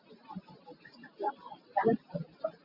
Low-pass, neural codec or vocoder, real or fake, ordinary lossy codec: 5.4 kHz; none; real; Opus, 64 kbps